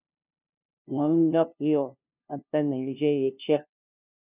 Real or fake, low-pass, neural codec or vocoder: fake; 3.6 kHz; codec, 16 kHz, 0.5 kbps, FunCodec, trained on LibriTTS, 25 frames a second